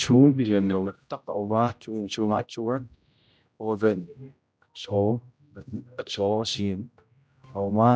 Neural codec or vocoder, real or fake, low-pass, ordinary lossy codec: codec, 16 kHz, 0.5 kbps, X-Codec, HuBERT features, trained on general audio; fake; none; none